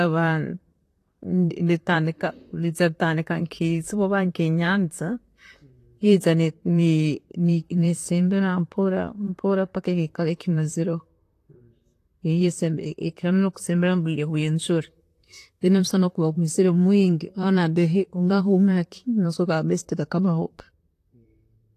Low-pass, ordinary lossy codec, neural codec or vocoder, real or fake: 14.4 kHz; AAC, 48 kbps; vocoder, 44.1 kHz, 128 mel bands, Pupu-Vocoder; fake